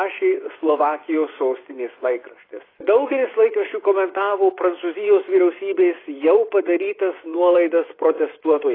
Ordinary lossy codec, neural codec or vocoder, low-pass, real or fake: AAC, 24 kbps; vocoder, 24 kHz, 100 mel bands, Vocos; 5.4 kHz; fake